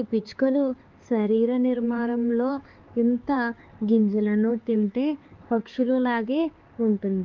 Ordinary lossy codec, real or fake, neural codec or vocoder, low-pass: Opus, 24 kbps; fake; codec, 16 kHz, 4 kbps, X-Codec, HuBERT features, trained on LibriSpeech; 7.2 kHz